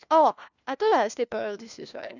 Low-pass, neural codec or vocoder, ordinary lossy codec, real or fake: 7.2 kHz; codec, 16 kHz, 1 kbps, FunCodec, trained on LibriTTS, 50 frames a second; none; fake